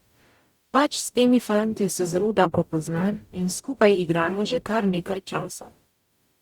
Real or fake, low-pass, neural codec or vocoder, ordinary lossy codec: fake; 19.8 kHz; codec, 44.1 kHz, 0.9 kbps, DAC; Opus, 64 kbps